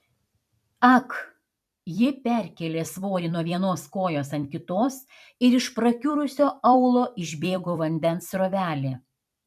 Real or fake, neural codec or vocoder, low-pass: fake; vocoder, 44.1 kHz, 128 mel bands every 256 samples, BigVGAN v2; 14.4 kHz